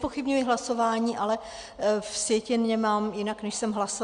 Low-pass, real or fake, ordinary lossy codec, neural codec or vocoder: 9.9 kHz; real; Opus, 64 kbps; none